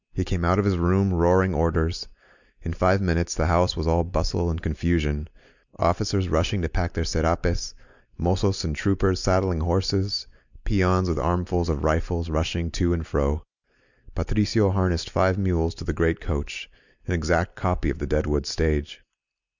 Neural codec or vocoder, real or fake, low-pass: none; real; 7.2 kHz